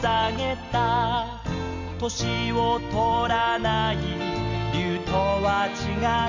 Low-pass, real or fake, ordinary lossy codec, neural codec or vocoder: 7.2 kHz; real; none; none